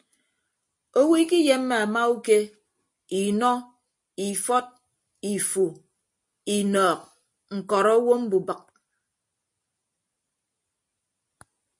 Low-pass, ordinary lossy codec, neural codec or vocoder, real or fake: 10.8 kHz; MP3, 48 kbps; none; real